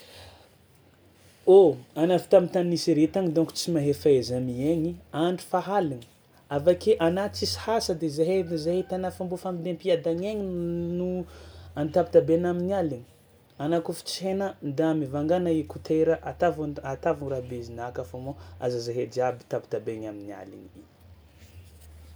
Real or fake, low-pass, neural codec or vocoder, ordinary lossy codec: real; none; none; none